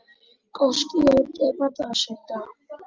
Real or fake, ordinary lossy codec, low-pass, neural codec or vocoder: real; Opus, 32 kbps; 7.2 kHz; none